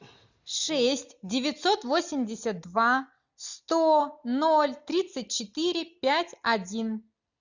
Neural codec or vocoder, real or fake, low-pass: none; real; 7.2 kHz